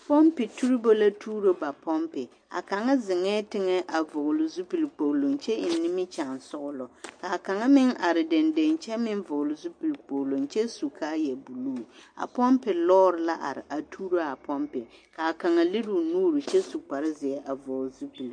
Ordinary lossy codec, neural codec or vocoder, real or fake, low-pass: MP3, 48 kbps; none; real; 9.9 kHz